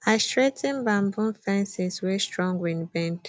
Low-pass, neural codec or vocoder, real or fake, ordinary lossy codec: none; none; real; none